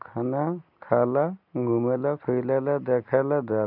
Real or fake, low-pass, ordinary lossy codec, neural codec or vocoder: real; 5.4 kHz; none; none